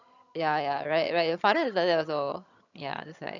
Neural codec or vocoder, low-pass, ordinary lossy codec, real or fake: vocoder, 22.05 kHz, 80 mel bands, HiFi-GAN; 7.2 kHz; none; fake